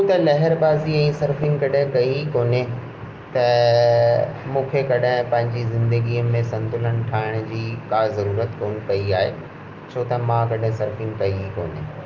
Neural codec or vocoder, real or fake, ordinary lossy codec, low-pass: none; real; Opus, 24 kbps; 7.2 kHz